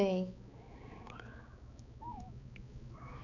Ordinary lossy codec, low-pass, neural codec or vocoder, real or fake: none; 7.2 kHz; codec, 16 kHz, 4 kbps, X-Codec, HuBERT features, trained on balanced general audio; fake